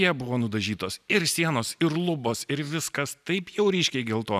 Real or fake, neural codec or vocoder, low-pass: real; none; 14.4 kHz